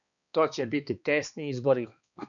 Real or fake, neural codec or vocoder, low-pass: fake; codec, 16 kHz, 2 kbps, X-Codec, HuBERT features, trained on balanced general audio; 7.2 kHz